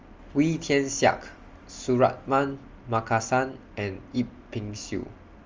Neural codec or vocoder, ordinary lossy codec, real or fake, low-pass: none; Opus, 32 kbps; real; 7.2 kHz